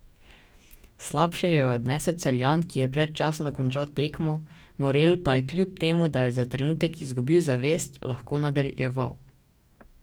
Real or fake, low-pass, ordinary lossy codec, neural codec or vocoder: fake; none; none; codec, 44.1 kHz, 2.6 kbps, DAC